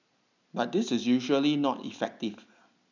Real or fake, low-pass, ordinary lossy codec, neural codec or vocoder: real; 7.2 kHz; none; none